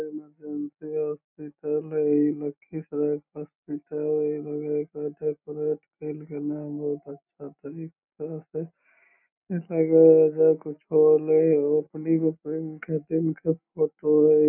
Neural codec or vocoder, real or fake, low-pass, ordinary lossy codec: none; real; 3.6 kHz; none